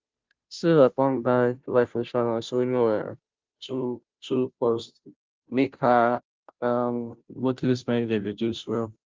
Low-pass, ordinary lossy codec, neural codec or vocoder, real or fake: 7.2 kHz; Opus, 32 kbps; codec, 16 kHz, 0.5 kbps, FunCodec, trained on Chinese and English, 25 frames a second; fake